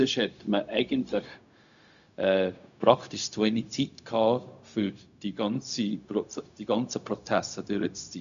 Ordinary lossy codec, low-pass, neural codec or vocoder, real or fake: none; 7.2 kHz; codec, 16 kHz, 0.4 kbps, LongCat-Audio-Codec; fake